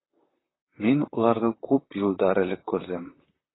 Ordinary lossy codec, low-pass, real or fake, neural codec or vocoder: AAC, 16 kbps; 7.2 kHz; fake; vocoder, 44.1 kHz, 128 mel bands, Pupu-Vocoder